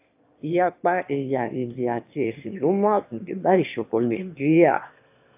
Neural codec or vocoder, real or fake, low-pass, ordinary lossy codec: autoencoder, 22.05 kHz, a latent of 192 numbers a frame, VITS, trained on one speaker; fake; 3.6 kHz; AAC, 32 kbps